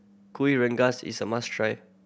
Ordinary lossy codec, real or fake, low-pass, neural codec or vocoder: none; real; none; none